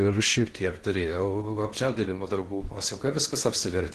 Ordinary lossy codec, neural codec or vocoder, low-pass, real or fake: Opus, 16 kbps; codec, 16 kHz in and 24 kHz out, 0.6 kbps, FocalCodec, streaming, 4096 codes; 10.8 kHz; fake